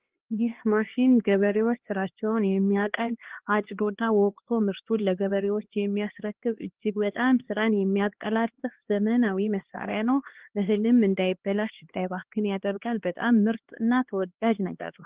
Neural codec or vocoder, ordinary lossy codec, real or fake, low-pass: codec, 16 kHz, 2 kbps, X-Codec, HuBERT features, trained on LibriSpeech; Opus, 16 kbps; fake; 3.6 kHz